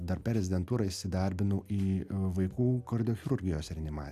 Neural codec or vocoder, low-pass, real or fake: none; 14.4 kHz; real